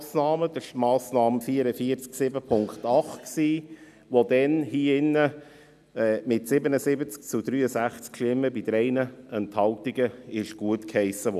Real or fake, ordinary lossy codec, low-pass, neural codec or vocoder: real; none; 14.4 kHz; none